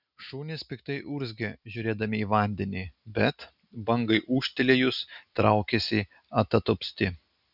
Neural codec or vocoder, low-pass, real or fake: none; 5.4 kHz; real